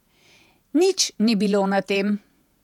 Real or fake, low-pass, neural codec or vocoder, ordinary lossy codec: fake; 19.8 kHz; vocoder, 48 kHz, 128 mel bands, Vocos; none